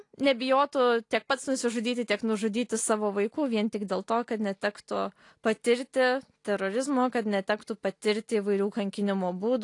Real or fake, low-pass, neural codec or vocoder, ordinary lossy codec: real; 10.8 kHz; none; AAC, 48 kbps